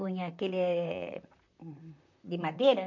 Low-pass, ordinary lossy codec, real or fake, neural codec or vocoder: 7.2 kHz; none; fake; vocoder, 44.1 kHz, 128 mel bands, Pupu-Vocoder